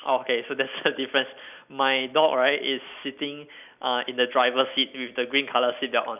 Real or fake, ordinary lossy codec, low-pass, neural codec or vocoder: real; none; 3.6 kHz; none